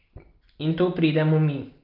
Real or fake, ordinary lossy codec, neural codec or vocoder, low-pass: real; Opus, 32 kbps; none; 5.4 kHz